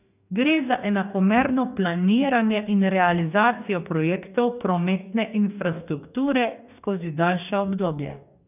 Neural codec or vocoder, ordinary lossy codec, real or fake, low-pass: codec, 44.1 kHz, 2.6 kbps, DAC; none; fake; 3.6 kHz